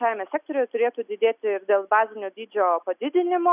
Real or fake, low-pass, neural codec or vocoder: real; 3.6 kHz; none